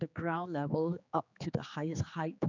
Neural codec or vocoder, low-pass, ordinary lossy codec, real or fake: codec, 16 kHz, 4 kbps, X-Codec, HuBERT features, trained on general audio; 7.2 kHz; none; fake